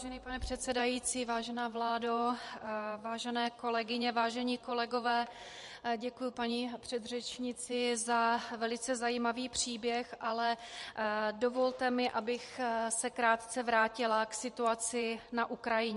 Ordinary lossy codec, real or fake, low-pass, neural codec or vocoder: MP3, 48 kbps; fake; 14.4 kHz; vocoder, 44.1 kHz, 128 mel bands every 512 samples, BigVGAN v2